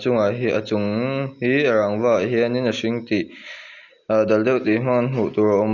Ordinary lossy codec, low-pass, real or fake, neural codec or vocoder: none; 7.2 kHz; real; none